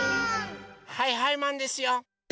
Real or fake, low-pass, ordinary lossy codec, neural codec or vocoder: real; none; none; none